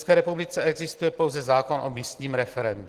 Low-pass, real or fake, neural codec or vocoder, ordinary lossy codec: 14.4 kHz; real; none; Opus, 16 kbps